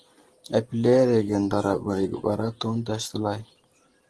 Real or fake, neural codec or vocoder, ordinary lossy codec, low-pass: fake; vocoder, 44.1 kHz, 128 mel bands every 512 samples, BigVGAN v2; Opus, 16 kbps; 10.8 kHz